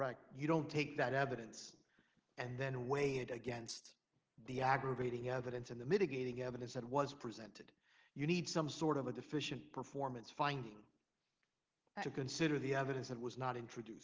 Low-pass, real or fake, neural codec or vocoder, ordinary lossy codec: 7.2 kHz; real; none; Opus, 16 kbps